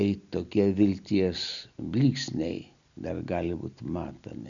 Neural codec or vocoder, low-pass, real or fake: none; 7.2 kHz; real